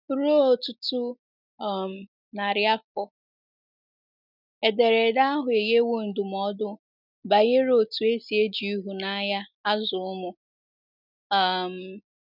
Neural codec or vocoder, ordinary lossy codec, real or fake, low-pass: none; none; real; 5.4 kHz